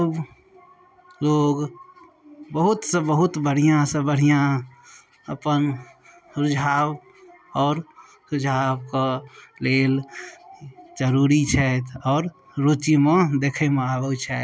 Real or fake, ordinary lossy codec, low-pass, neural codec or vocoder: real; none; none; none